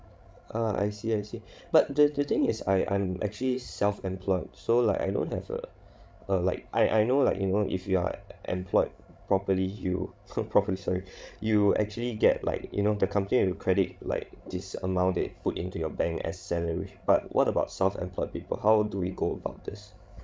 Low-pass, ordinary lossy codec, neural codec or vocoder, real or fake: none; none; codec, 16 kHz, 16 kbps, FreqCodec, larger model; fake